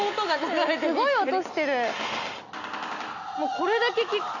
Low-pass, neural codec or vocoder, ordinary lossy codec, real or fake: 7.2 kHz; none; none; real